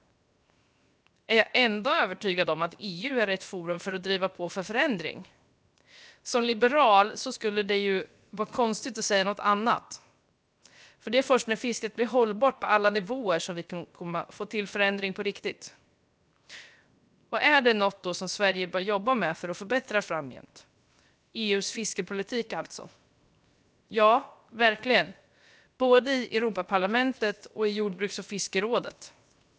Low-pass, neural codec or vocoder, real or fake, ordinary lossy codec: none; codec, 16 kHz, 0.7 kbps, FocalCodec; fake; none